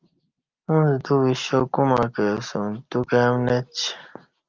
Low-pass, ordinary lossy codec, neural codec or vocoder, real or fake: 7.2 kHz; Opus, 24 kbps; none; real